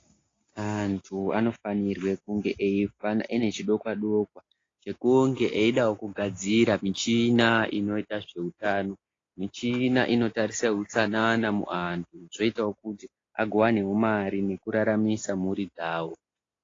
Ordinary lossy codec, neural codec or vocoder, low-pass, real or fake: AAC, 32 kbps; none; 7.2 kHz; real